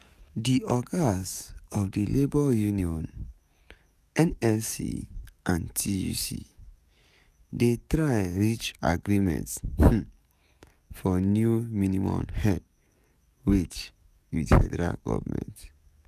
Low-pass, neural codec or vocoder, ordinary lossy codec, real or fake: 14.4 kHz; codec, 44.1 kHz, 7.8 kbps, DAC; none; fake